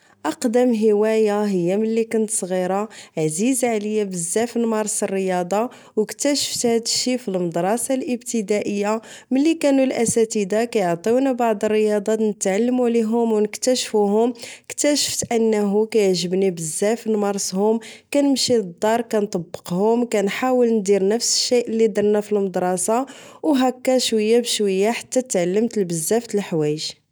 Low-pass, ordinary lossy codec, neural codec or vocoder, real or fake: none; none; none; real